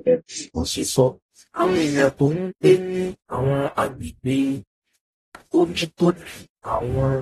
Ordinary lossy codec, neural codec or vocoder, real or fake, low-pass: AAC, 32 kbps; codec, 44.1 kHz, 0.9 kbps, DAC; fake; 19.8 kHz